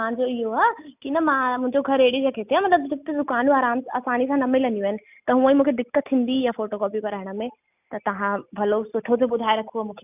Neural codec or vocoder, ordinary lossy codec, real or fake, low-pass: none; none; real; 3.6 kHz